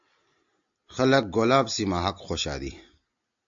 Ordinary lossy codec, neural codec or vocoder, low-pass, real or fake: AAC, 64 kbps; none; 7.2 kHz; real